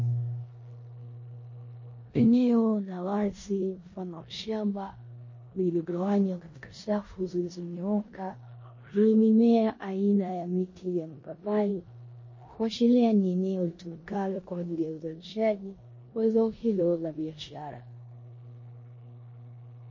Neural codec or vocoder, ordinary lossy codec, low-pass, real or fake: codec, 16 kHz in and 24 kHz out, 0.9 kbps, LongCat-Audio-Codec, four codebook decoder; MP3, 32 kbps; 7.2 kHz; fake